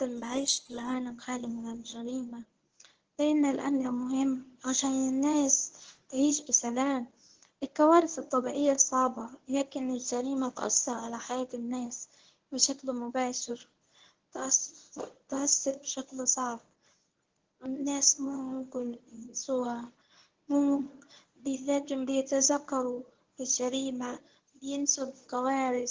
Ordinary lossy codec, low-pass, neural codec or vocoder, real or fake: Opus, 16 kbps; 7.2 kHz; codec, 24 kHz, 0.9 kbps, WavTokenizer, medium speech release version 1; fake